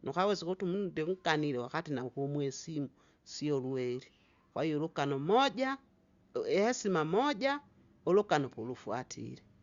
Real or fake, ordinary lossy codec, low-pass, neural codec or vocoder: real; Opus, 64 kbps; 7.2 kHz; none